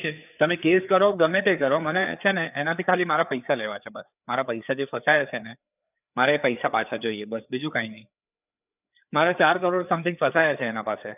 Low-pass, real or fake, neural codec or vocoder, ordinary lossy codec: 3.6 kHz; fake; codec, 16 kHz, 4 kbps, FreqCodec, larger model; none